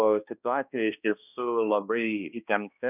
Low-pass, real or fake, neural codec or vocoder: 3.6 kHz; fake; codec, 16 kHz, 1 kbps, X-Codec, HuBERT features, trained on balanced general audio